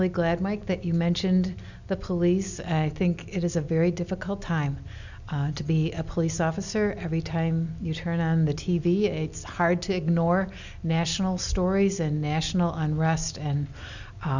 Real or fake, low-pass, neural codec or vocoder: real; 7.2 kHz; none